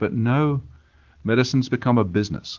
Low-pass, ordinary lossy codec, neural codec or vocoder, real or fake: 7.2 kHz; Opus, 16 kbps; none; real